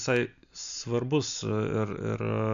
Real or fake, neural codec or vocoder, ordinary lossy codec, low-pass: real; none; AAC, 96 kbps; 7.2 kHz